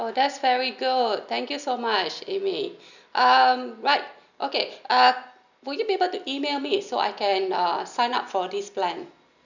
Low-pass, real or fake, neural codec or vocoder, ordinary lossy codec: 7.2 kHz; real; none; none